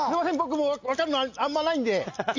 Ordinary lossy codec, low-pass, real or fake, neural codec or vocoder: AAC, 48 kbps; 7.2 kHz; real; none